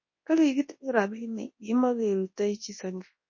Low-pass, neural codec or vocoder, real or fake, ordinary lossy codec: 7.2 kHz; codec, 24 kHz, 0.9 kbps, WavTokenizer, large speech release; fake; MP3, 32 kbps